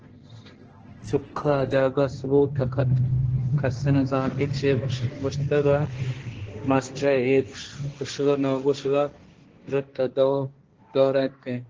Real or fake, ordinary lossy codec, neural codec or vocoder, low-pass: fake; Opus, 16 kbps; codec, 16 kHz, 1.1 kbps, Voila-Tokenizer; 7.2 kHz